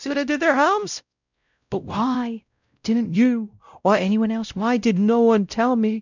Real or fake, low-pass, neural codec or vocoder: fake; 7.2 kHz; codec, 16 kHz, 0.5 kbps, X-Codec, WavLM features, trained on Multilingual LibriSpeech